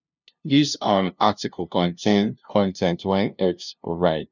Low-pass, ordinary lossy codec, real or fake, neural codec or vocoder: 7.2 kHz; none; fake; codec, 16 kHz, 0.5 kbps, FunCodec, trained on LibriTTS, 25 frames a second